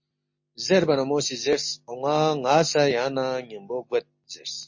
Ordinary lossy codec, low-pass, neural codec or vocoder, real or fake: MP3, 32 kbps; 7.2 kHz; none; real